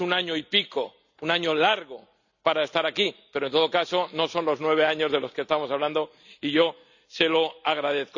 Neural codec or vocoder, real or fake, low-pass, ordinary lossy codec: none; real; 7.2 kHz; none